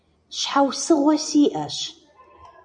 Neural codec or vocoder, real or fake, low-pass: vocoder, 44.1 kHz, 128 mel bands every 256 samples, BigVGAN v2; fake; 9.9 kHz